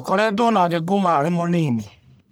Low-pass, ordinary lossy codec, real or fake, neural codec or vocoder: none; none; fake; codec, 44.1 kHz, 1.7 kbps, Pupu-Codec